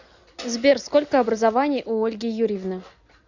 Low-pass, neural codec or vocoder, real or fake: 7.2 kHz; none; real